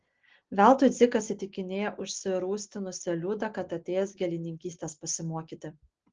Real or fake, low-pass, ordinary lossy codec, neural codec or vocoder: real; 7.2 kHz; Opus, 16 kbps; none